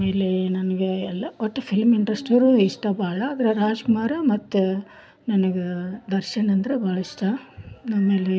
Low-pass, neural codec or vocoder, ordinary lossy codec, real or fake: none; none; none; real